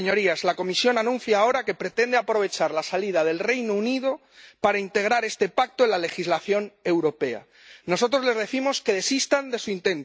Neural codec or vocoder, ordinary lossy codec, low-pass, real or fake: none; none; none; real